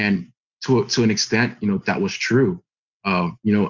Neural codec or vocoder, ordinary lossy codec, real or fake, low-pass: none; Opus, 64 kbps; real; 7.2 kHz